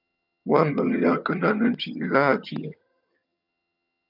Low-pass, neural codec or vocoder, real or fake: 5.4 kHz; vocoder, 22.05 kHz, 80 mel bands, HiFi-GAN; fake